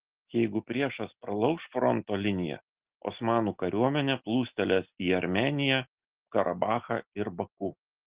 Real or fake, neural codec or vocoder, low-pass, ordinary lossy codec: real; none; 3.6 kHz; Opus, 16 kbps